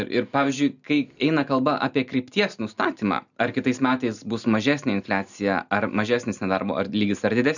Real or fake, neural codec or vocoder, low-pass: real; none; 7.2 kHz